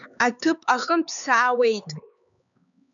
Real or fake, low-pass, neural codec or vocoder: fake; 7.2 kHz; codec, 16 kHz, 4 kbps, X-Codec, HuBERT features, trained on LibriSpeech